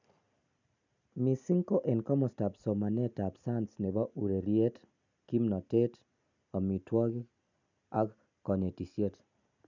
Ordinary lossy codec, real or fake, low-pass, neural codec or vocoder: none; real; 7.2 kHz; none